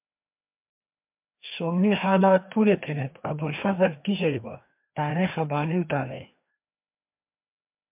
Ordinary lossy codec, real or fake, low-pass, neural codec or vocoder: MP3, 32 kbps; fake; 3.6 kHz; codec, 16 kHz, 2 kbps, FreqCodec, larger model